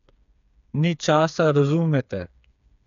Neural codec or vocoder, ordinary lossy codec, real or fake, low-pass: codec, 16 kHz, 4 kbps, FreqCodec, smaller model; none; fake; 7.2 kHz